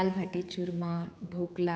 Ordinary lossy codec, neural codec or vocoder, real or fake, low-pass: none; codec, 16 kHz, 4 kbps, X-Codec, HuBERT features, trained on general audio; fake; none